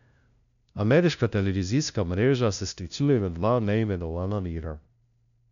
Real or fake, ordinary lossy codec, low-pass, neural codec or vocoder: fake; none; 7.2 kHz; codec, 16 kHz, 0.5 kbps, FunCodec, trained on LibriTTS, 25 frames a second